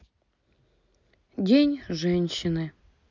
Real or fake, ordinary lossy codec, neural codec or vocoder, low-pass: real; none; none; 7.2 kHz